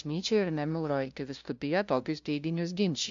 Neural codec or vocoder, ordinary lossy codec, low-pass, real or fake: codec, 16 kHz, 0.5 kbps, FunCodec, trained on LibriTTS, 25 frames a second; AAC, 64 kbps; 7.2 kHz; fake